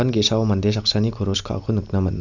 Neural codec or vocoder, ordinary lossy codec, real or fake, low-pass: none; none; real; 7.2 kHz